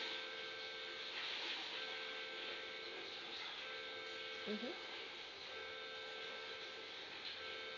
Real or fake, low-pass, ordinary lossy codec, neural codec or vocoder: real; 7.2 kHz; none; none